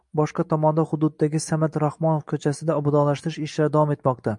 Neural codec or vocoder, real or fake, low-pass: none; real; 10.8 kHz